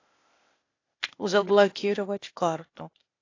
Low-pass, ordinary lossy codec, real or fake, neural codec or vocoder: 7.2 kHz; AAC, 32 kbps; fake; codec, 16 kHz, 0.8 kbps, ZipCodec